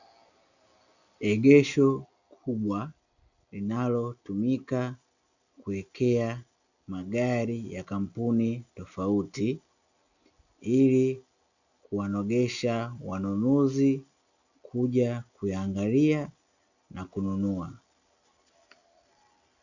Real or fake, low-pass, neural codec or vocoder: real; 7.2 kHz; none